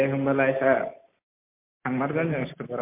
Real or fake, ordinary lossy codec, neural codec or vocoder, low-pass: real; MP3, 24 kbps; none; 3.6 kHz